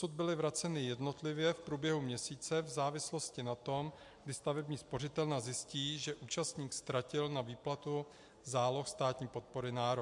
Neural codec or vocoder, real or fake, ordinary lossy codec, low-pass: none; real; MP3, 64 kbps; 10.8 kHz